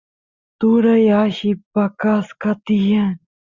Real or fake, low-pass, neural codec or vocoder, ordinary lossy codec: real; 7.2 kHz; none; Opus, 64 kbps